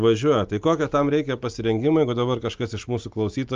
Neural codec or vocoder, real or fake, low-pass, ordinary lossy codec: none; real; 7.2 kHz; Opus, 24 kbps